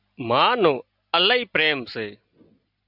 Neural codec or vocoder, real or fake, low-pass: none; real; 5.4 kHz